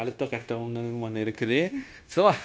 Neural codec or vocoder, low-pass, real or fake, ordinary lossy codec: codec, 16 kHz, 0.9 kbps, LongCat-Audio-Codec; none; fake; none